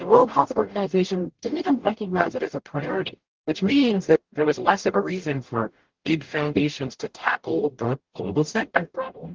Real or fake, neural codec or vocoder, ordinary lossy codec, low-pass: fake; codec, 44.1 kHz, 0.9 kbps, DAC; Opus, 16 kbps; 7.2 kHz